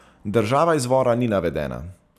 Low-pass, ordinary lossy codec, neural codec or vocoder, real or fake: 14.4 kHz; none; none; real